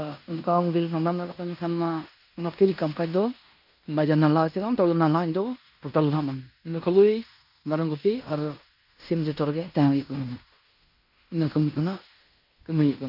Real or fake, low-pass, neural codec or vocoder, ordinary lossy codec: fake; 5.4 kHz; codec, 16 kHz in and 24 kHz out, 0.9 kbps, LongCat-Audio-Codec, fine tuned four codebook decoder; none